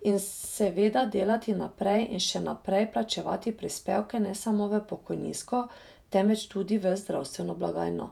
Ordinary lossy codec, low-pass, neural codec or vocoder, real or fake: none; 19.8 kHz; vocoder, 48 kHz, 128 mel bands, Vocos; fake